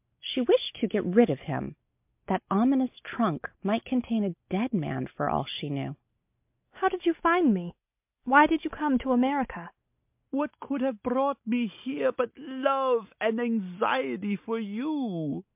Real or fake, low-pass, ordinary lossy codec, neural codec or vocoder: real; 3.6 kHz; MP3, 32 kbps; none